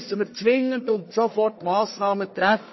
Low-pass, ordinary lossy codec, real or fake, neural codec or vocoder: 7.2 kHz; MP3, 24 kbps; fake; codec, 44.1 kHz, 1.7 kbps, Pupu-Codec